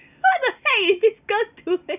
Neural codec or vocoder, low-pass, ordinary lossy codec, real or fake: none; 3.6 kHz; none; real